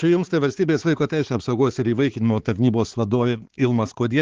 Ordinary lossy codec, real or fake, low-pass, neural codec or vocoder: Opus, 16 kbps; fake; 7.2 kHz; codec, 16 kHz, 4 kbps, X-Codec, HuBERT features, trained on balanced general audio